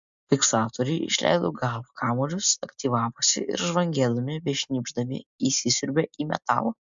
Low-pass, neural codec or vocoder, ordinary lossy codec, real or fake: 7.2 kHz; none; MP3, 64 kbps; real